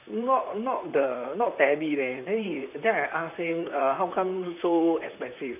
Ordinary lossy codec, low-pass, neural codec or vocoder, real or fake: none; 3.6 kHz; vocoder, 44.1 kHz, 128 mel bands, Pupu-Vocoder; fake